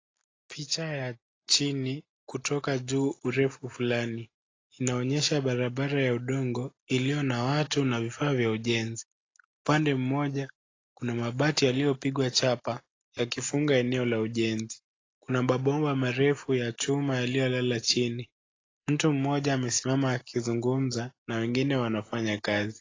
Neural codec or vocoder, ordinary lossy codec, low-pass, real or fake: none; AAC, 32 kbps; 7.2 kHz; real